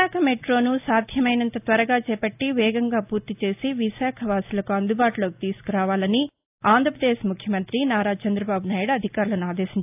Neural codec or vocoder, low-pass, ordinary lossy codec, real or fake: none; 3.6 kHz; AAC, 32 kbps; real